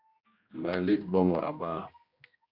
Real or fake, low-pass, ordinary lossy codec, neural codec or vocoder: fake; 5.4 kHz; Opus, 64 kbps; codec, 16 kHz, 1 kbps, X-Codec, HuBERT features, trained on general audio